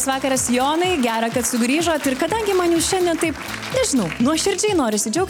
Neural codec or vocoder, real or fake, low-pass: none; real; 19.8 kHz